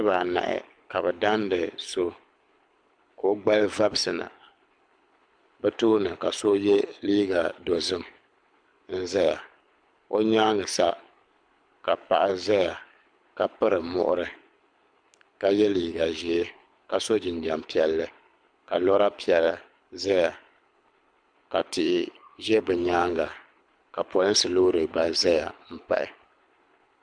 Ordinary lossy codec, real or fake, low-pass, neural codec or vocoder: MP3, 96 kbps; fake; 9.9 kHz; codec, 24 kHz, 6 kbps, HILCodec